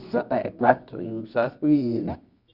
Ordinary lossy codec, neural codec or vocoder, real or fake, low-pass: Opus, 64 kbps; codec, 24 kHz, 0.9 kbps, WavTokenizer, medium music audio release; fake; 5.4 kHz